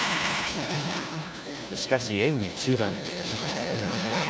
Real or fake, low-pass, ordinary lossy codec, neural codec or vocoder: fake; none; none; codec, 16 kHz, 1 kbps, FunCodec, trained on LibriTTS, 50 frames a second